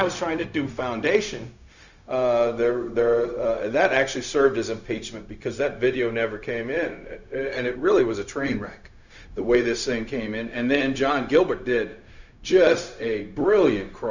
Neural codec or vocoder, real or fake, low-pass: codec, 16 kHz, 0.4 kbps, LongCat-Audio-Codec; fake; 7.2 kHz